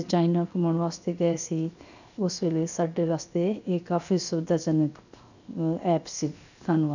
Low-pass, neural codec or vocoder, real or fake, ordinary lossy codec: 7.2 kHz; codec, 16 kHz, 0.7 kbps, FocalCodec; fake; none